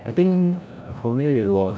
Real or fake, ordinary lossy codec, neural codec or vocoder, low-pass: fake; none; codec, 16 kHz, 0.5 kbps, FreqCodec, larger model; none